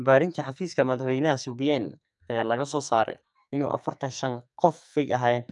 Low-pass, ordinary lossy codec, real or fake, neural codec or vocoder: 10.8 kHz; none; fake; codec, 44.1 kHz, 2.6 kbps, SNAC